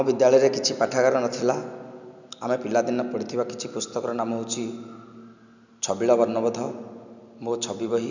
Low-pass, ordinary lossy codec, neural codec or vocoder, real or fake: 7.2 kHz; none; none; real